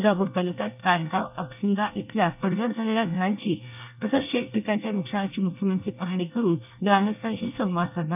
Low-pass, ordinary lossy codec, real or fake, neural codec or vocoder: 3.6 kHz; none; fake; codec, 24 kHz, 1 kbps, SNAC